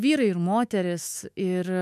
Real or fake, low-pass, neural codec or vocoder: fake; 14.4 kHz; autoencoder, 48 kHz, 128 numbers a frame, DAC-VAE, trained on Japanese speech